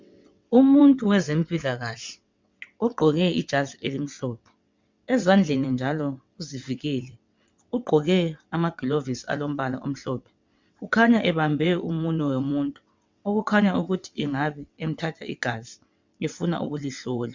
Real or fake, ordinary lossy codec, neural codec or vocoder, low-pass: fake; MP3, 64 kbps; vocoder, 22.05 kHz, 80 mel bands, WaveNeXt; 7.2 kHz